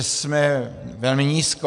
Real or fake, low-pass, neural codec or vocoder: real; 10.8 kHz; none